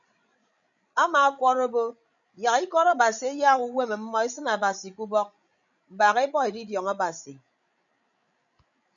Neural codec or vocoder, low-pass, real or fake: codec, 16 kHz, 16 kbps, FreqCodec, larger model; 7.2 kHz; fake